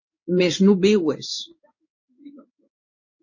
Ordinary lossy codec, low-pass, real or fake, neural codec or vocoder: MP3, 32 kbps; 7.2 kHz; fake; codec, 16 kHz in and 24 kHz out, 1 kbps, XY-Tokenizer